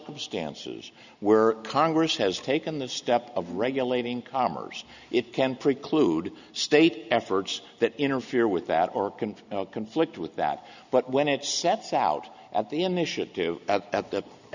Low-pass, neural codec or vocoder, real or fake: 7.2 kHz; none; real